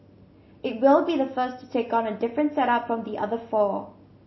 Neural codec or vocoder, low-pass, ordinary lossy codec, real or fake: none; 7.2 kHz; MP3, 24 kbps; real